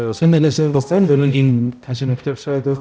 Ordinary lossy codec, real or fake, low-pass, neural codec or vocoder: none; fake; none; codec, 16 kHz, 0.5 kbps, X-Codec, HuBERT features, trained on balanced general audio